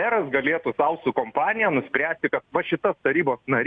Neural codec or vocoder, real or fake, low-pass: none; real; 9.9 kHz